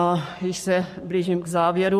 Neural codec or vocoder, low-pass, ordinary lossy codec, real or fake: codec, 44.1 kHz, 7.8 kbps, Pupu-Codec; 14.4 kHz; MP3, 64 kbps; fake